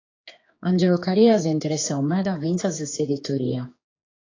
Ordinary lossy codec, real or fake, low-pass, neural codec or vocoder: AAC, 32 kbps; fake; 7.2 kHz; codec, 16 kHz, 4 kbps, X-Codec, HuBERT features, trained on general audio